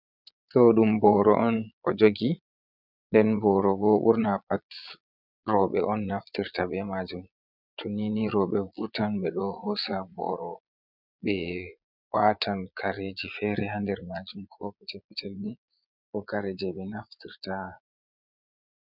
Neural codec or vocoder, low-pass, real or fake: vocoder, 22.05 kHz, 80 mel bands, Vocos; 5.4 kHz; fake